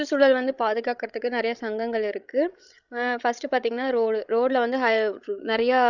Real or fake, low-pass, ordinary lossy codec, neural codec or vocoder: fake; 7.2 kHz; none; codec, 16 kHz, 16 kbps, FreqCodec, larger model